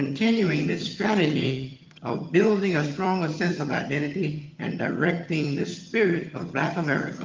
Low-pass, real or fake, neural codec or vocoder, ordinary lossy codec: 7.2 kHz; fake; vocoder, 22.05 kHz, 80 mel bands, HiFi-GAN; Opus, 32 kbps